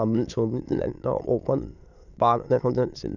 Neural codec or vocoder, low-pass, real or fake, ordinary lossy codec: autoencoder, 22.05 kHz, a latent of 192 numbers a frame, VITS, trained on many speakers; 7.2 kHz; fake; none